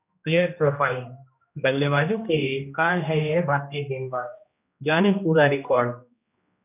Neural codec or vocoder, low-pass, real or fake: codec, 16 kHz, 1 kbps, X-Codec, HuBERT features, trained on general audio; 3.6 kHz; fake